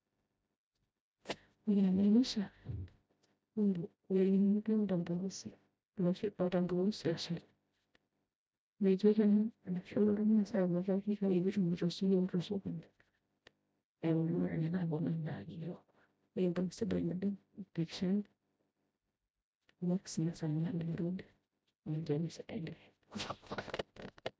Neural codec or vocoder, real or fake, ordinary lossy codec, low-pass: codec, 16 kHz, 0.5 kbps, FreqCodec, smaller model; fake; none; none